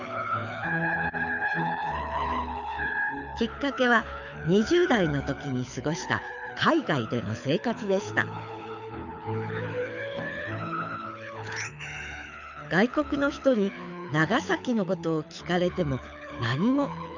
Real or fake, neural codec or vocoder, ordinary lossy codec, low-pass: fake; codec, 24 kHz, 6 kbps, HILCodec; none; 7.2 kHz